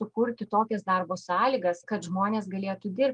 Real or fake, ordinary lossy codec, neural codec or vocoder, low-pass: real; Opus, 32 kbps; none; 9.9 kHz